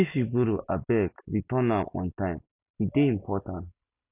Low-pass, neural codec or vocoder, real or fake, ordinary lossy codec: 3.6 kHz; none; real; none